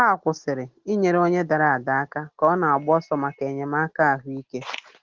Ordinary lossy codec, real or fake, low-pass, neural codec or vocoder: Opus, 16 kbps; real; 7.2 kHz; none